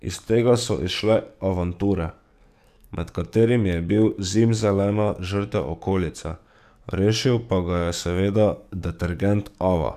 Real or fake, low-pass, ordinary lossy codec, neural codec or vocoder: fake; 14.4 kHz; none; codec, 44.1 kHz, 7.8 kbps, DAC